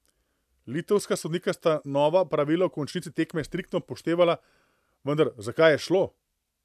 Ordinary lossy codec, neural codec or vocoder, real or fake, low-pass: AAC, 96 kbps; none; real; 14.4 kHz